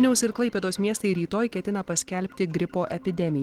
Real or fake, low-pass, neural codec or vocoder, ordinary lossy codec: real; 19.8 kHz; none; Opus, 16 kbps